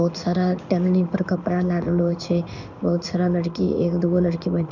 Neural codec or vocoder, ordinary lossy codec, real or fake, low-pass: codec, 16 kHz in and 24 kHz out, 1 kbps, XY-Tokenizer; none; fake; 7.2 kHz